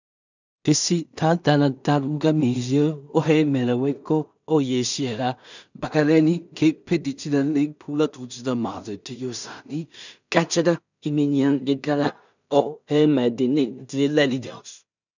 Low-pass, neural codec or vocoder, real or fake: 7.2 kHz; codec, 16 kHz in and 24 kHz out, 0.4 kbps, LongCat-Audio-Codec, two codebook decoder; fake